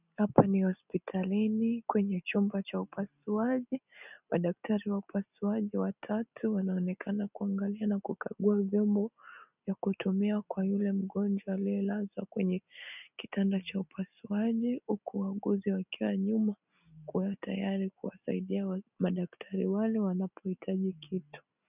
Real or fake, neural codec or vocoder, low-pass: real; none; 3.6 kHz